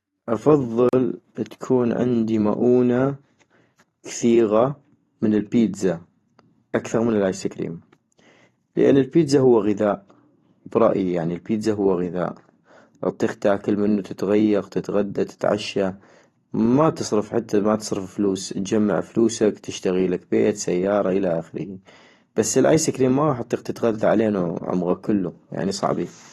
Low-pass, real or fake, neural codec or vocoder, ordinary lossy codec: 19.8 kHz; fake; vocoder, 44.1 kHz, 128 mel bands every 512 samples, BigVGAN v2; AAC, 32 kbps